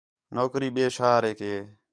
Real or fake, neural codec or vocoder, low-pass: fake; codec, 44.1 kHz, 7.8 kbps, DAC; 9.9 kHz